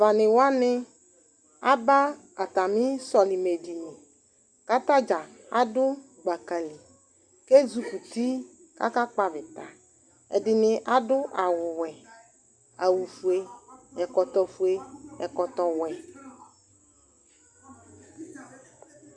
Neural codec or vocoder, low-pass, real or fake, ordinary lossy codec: none; 9.9 kHz; real; Opus, 64 kbps